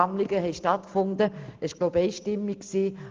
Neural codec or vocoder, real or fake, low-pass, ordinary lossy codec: none; real; 7.2 kHz; Opus, 24 kbps